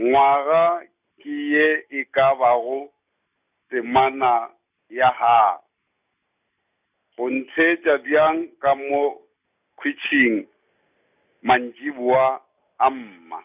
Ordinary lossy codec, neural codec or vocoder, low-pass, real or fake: none; none; 3.6 kHz; real